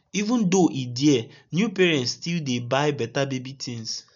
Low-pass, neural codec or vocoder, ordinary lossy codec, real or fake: 7.2 kHz; none; none; real